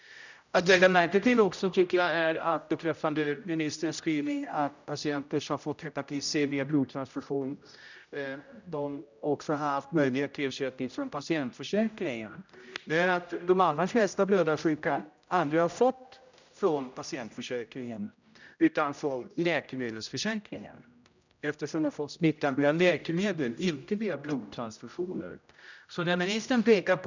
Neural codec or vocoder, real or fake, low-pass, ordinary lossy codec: codec, 16 kHz, 0.5 kbps, X-Codec, HuBERT features, trained on general audio; fake; 7.2 kHz; none